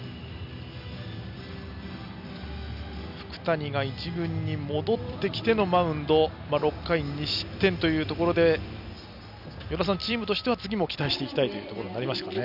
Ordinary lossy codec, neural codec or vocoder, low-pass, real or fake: none; none; 5.4 kHz; real